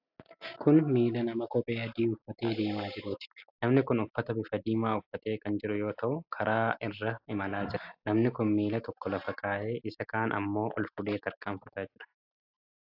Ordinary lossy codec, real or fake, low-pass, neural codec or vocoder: AAC, 48 kbps; real; 5.4 kHz; none